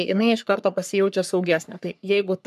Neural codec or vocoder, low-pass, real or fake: codec, 44.1 kHz, 3.4 kbps, Pupu-Codec; 14.4 kHz; fake